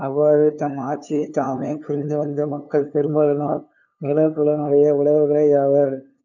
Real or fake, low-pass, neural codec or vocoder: fake; 7.2 kHz; codec, 16 kHz, 2 kbps, FunCodec, trained on LibriTTS, 25 frames a second